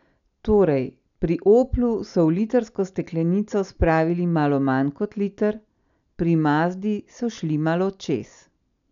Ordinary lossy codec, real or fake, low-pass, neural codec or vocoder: none; real; 7.2 kHz; none